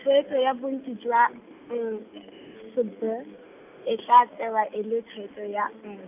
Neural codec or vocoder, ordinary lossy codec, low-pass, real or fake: none; none; 3.6 kHz; real